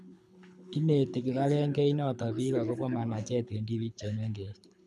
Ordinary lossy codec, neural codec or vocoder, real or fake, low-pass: none; codec, 24 kHz, 6 kbps, HILCodec; fake; none